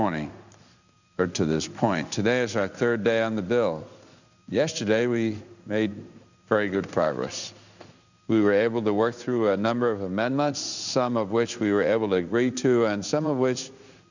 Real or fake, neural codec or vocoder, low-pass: fake; codec, 16 kHz in and 24 kHz out, 1 kbps, XY-Tokenizer; 7.2 kHz